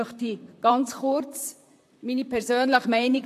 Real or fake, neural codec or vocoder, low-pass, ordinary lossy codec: fake; vocoder, 44.1 kHz, 128 mel bands, Pupu-Vocoder; 14.4 kHz; AAC, 64 kbps